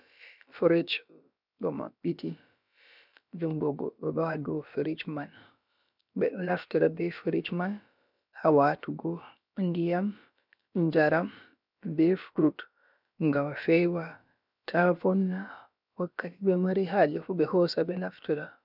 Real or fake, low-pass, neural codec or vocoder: fake; 5.4 kHz; codec, 16 kHz, about 1 kbps, DyCAST, with the encoder's durations